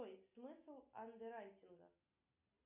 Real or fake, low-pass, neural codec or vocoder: real; 3.6 kHz; none